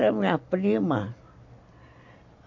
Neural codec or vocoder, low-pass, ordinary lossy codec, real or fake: none; 7.2 kHz; MP3, 48 kbps; real